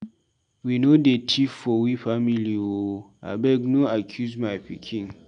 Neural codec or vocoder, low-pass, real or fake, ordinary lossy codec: none; 9.9 kHz; real; none